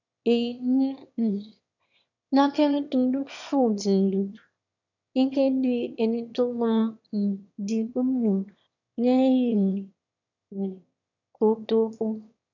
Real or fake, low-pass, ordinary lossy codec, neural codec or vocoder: fake; 7.2 kHz; none; autoencoder, 22.05 kHz, a latent of 192 numbers a frame, VITS, trained on one speaker